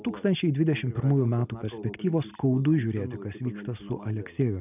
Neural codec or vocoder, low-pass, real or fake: none; 3.6 kHz; real